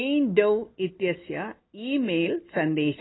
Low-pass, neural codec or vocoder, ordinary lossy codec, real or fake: 7.2 kHz; none; AAC, 16 kbps; real